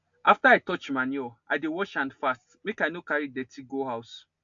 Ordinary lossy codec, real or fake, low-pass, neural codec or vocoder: AAC, 64 kbps; real; 7.2 kHz; none